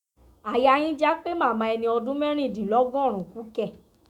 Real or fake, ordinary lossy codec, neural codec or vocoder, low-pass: fake; Opus, 64 kbps; autoencoder, 48 kHz, 128 numbers a frame, DAC-VAE, trained on Japanese speech; 19.8 kHz